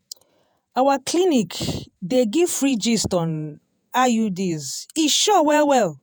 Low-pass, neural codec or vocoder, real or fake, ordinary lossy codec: none; vocoder, 48 kHz, 128 mel bands, Vocos; fake; none